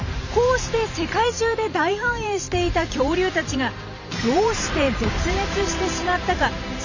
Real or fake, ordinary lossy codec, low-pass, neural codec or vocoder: fake; none; 7.2 kHz; vocoder, 44.1 kHz, 128 mel bands every 512 samples, BigVGAN v2